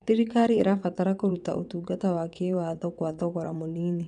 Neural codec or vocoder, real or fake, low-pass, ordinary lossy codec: vocoder, 22.05 kHz, 80 mel bands, WaveNeXt; fake; 9.9 kHz; none